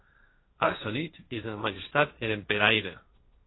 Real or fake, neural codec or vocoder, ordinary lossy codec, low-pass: fake; codec, 16 kHz, 1.1 kbps, Voila-Tokenizer; AAC, 16 kbps; 7.2 kHz